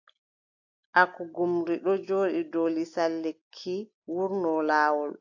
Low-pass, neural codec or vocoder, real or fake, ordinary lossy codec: 7.2 kHz; none; real; AAC, 48 kbps